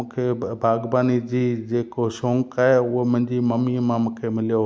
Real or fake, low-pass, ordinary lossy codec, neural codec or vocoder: real; none; none; none